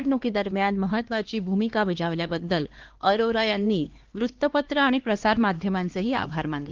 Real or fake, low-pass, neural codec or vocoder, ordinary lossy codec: fake; 7.2 kHz; codec, 16 kHz, 2 kbps, X-Codec, HuBERT features, trained on LibriSpeech; Opus, 16 kbps